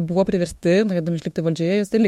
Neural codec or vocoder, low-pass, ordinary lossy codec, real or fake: autoencoder, 48 kHz, 32 numbers a frame, DAC-VAE, trained on Japanese speech; 19.8 kHz; MP3, 64 kbps; fake